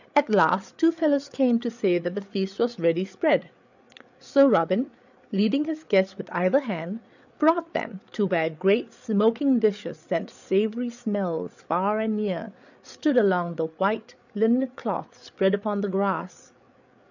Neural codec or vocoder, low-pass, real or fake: codec, 16 kHz, 8 kbps, FreqCodec, larger model; 7.2 kHz; fake